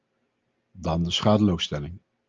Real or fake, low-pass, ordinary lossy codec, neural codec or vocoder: real; 7.2 kHz; Opus, 24 kbps; none